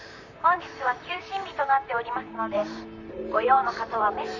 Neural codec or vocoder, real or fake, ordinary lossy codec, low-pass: vocoder, 44.1 kHz, 128 mel bands, Pupu-Vocoder; fake; none; 7.2 kHz